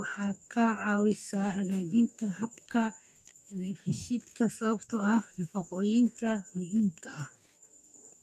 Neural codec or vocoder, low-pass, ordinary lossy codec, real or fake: codec, 44.1 kHz, 2.6 kbps, DAC; 14.4 kHz; none; fake